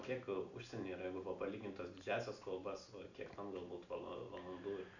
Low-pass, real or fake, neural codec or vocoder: 7.2 kHz; real; none